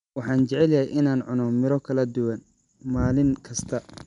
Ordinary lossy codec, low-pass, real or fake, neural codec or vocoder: none; 10.8 kHz; real; none